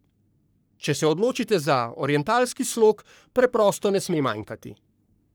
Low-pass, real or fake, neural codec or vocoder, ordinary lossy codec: none; fake; codec, 44.1 kHz, 3.4 kbps, Pupu-Codec; none